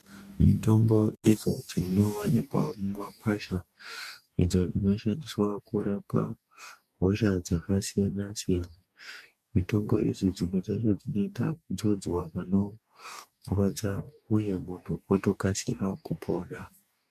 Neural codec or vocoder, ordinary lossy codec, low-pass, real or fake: codec, 44.1 kHz, 2.6 kbps, DAC; MP3, 96 kbps; 14.4 kHz; fake